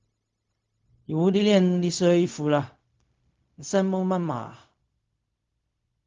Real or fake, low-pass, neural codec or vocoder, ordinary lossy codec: fake; 7.2 kHz; codec, 16 kHz, 0.4 kbps, LongCat-Audio-Codec; Opus, 32 kbps